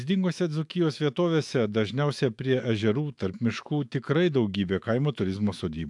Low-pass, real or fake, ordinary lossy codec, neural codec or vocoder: 10.8 kHz; fake; AAC, 64 kbps; autoencoder, 48 kHz, 128 numbers a frame, DAC-VAE, trained on Japanese speech